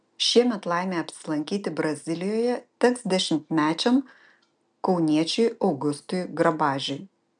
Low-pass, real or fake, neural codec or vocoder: 9.9 kHz; real; none